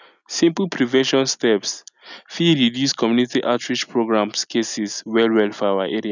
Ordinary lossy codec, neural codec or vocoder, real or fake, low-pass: none; none; real; 7.2 kHz